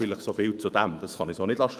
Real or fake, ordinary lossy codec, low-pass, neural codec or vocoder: fake; Opus, 32 kbps; 14.4 kHz; autoencoder, 48 kHz, 128 numbers a frame, DAC-VAE, trained on Japanese speech